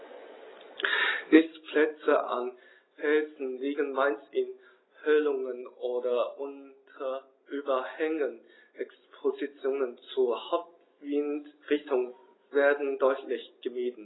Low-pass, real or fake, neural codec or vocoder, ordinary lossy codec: 7.2 kHz; real; none; AAC, 16 kbps